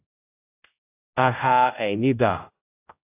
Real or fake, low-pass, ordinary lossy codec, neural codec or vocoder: fake; 3.6 kHz; AAC, 24 kbps; codec, 16 kHz, 0.5 kbps, X-Codec, HuBERT features, trained on general audio